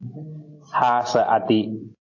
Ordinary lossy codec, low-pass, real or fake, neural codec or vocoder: AAC, 48 kbps; 7.2 kHz; real; none